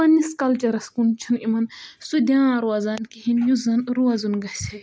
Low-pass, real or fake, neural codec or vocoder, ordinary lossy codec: none; real; none; none